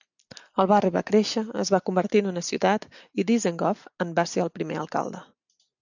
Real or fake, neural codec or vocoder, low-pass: real; none; 7.2 kHz